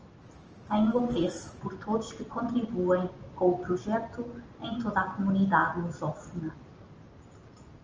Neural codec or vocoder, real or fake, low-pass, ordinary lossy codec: none; real; 7.2 kHz; Opus, 24 kbps